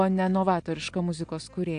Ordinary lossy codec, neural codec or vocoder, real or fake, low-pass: AAC, 64 kbps; none; real; 9.9 kHz